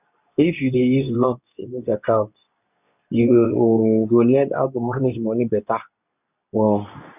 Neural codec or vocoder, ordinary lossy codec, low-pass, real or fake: codec, 24 kHz, 0.9 kbps, WavTokenizer, medium speech release version 2; none; 3.6 kHz; fake